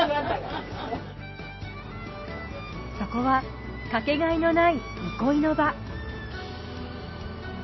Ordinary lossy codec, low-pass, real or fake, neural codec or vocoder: MP3, 24 kbps; 7.2 kHz; real; none